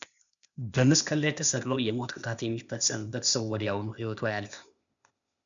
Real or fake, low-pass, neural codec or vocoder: fake; 7.2 kHz; codec, 16 kHz, 0.8 kbps, ZipCodec